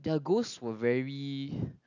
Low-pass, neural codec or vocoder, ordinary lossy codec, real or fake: 7.2 kHz; none; none; real